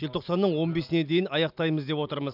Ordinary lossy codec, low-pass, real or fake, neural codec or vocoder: none; 5.4 kHz; real; none